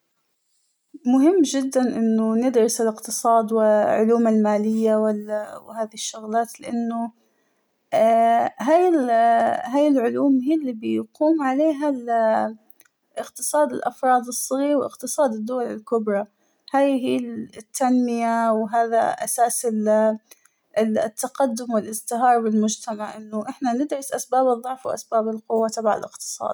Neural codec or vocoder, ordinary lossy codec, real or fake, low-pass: none; none; real; none